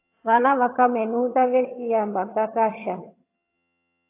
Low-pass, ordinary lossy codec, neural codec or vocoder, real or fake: 3.6 kHz; AAC, 24 kbps; vocoder, 22.05 kHz, 80 mel bands, HiFi-GAN; fake